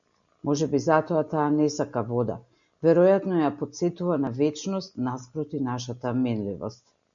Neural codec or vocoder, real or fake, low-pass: none; real; 7.2 kHz